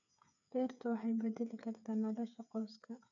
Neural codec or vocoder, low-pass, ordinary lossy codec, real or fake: codec, 16 kHz, 8 kbps, FreqCodec, smaller model; 7.2 kHz; none; fake